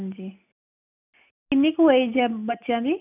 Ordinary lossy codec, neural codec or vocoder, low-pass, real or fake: none; none; 3.6 kHz; real